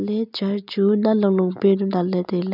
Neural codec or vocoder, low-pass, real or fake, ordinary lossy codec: none; 5.4 kHz; real; none